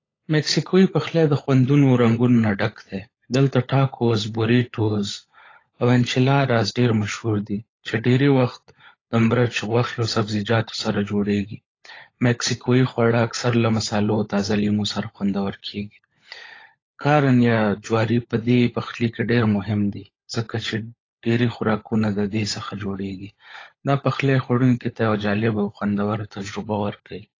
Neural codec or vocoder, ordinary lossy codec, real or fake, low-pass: codec, 16 kHz, 16 kbps, FunCodec, trained on LibriTTS, 50 frames a second; AAC, 32 kbps; fake; 7.2 kHz